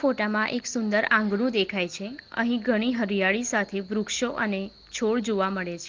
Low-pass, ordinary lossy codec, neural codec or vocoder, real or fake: 7.2 kHz; Opus, 16 kbps; none; real